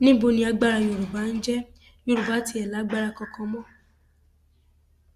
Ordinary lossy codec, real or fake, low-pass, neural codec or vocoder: Opus, 64 kbps; real; 14.4 kHz; none